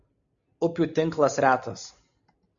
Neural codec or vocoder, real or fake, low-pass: none; real; 7.2 kHz